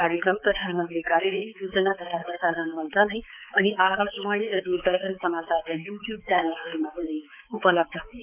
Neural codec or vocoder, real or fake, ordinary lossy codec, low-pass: codec, 16 kHz, 4 kbps, X-Codec, HuBERT features, trained on balanced general audio; fake; none; 3.6 kHz